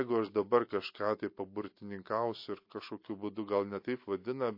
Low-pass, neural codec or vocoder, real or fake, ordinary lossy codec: 5.4 kHz; none; real; MP3, 32 kbps